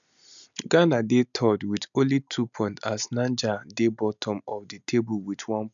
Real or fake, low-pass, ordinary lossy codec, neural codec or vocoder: real; 7.2 kHz; none; none